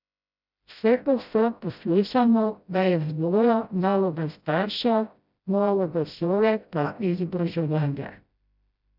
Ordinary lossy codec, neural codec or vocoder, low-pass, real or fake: none; codec, 16 kHz, 0.5 kbps, FreqCodec, smaller model; 5.4 kHz; fake